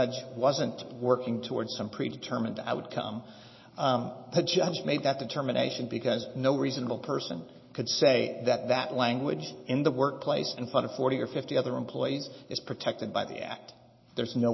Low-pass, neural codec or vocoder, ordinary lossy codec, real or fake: 7.2 kHz; none; MP3, 24 kbps; real